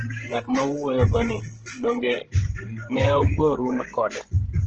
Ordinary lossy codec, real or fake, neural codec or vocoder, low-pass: Opus, 16 kbps; fake; codec, 16 kHz, 16 kbps, FreqCodec, larger model; 7.2 kHz